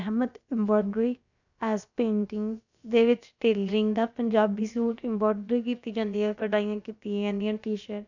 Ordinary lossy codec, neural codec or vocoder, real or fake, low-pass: none; codec, 16 kHz, about 1 kbps, DyCAST, with the encoder's durations; fake; 7.2 kHz